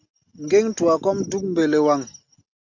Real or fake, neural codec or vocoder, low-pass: real; none; 7.2 kHz